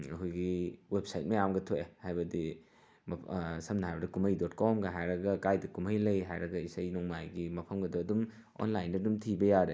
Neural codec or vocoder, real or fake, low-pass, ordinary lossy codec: none; real; none; none